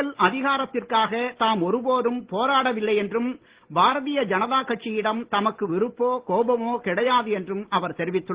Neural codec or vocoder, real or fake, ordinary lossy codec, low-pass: none; real; Opus, 16 kbps; 3.6 kHz